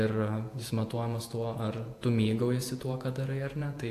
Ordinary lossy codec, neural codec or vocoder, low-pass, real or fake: AAC, 64 kbps; vocoder, 48 kHz, 128 mel bands, Vocos; 14.4 kHz; fake